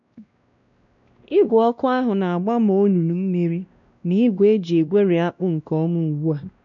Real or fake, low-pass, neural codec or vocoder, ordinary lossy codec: fake; 7.2 kHz; codec, 16 kHz, 1 kbps, X-Codec, WavLM features, trained on Multilingual LibriSpeech; none